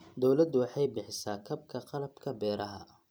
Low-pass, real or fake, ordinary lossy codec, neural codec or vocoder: none; fake; none; vocoder, 44.1 kHz, 128 mel bands every 256 samples, BigVGAN v2